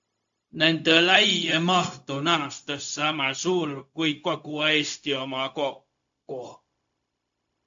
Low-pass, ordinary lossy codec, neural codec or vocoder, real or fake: 7.2 kHz; AAC, 64 kbps; codec, 16 kHz, 0.4 kbps, LongCat-Audio-Codec; fake